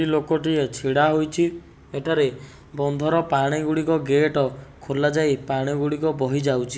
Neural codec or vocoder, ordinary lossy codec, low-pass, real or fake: none; none; none; real